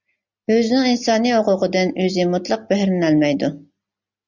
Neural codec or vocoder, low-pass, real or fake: none; 7.2 kHz; real